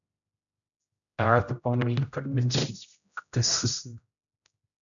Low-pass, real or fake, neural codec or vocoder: 7.2 kHz; fake; codec, 16 kHz, 0.5 kbps, X-Codec, HuBERT features, trained on general audio